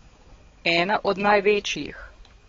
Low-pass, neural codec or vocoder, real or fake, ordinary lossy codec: 7.2 kHz; codec, 16 kHz, 4 kbps, X-Codec, HuBERT features, trained on balanced general audio; fake; AAC, 24 kbps